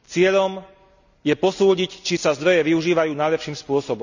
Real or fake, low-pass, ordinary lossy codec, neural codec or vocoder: real; 7.2 kHz; none; none